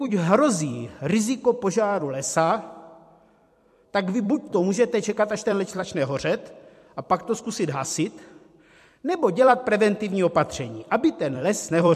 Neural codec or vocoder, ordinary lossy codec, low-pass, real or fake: vocoder, 44.1 kHz, 128 mel bands every 256 samples, BigVGAN v2; MP3, 64 kbps; 14.4 kHz; fake